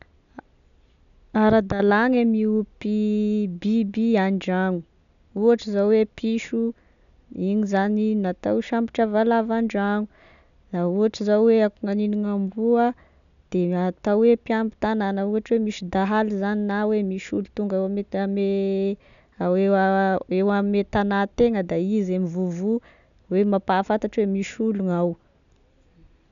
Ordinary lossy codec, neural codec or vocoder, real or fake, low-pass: none; none; real; 7.2 kHz